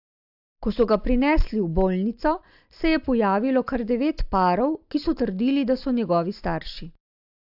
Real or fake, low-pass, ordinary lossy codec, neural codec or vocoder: real; 5.4 kHz; AAC, 48 kbps; none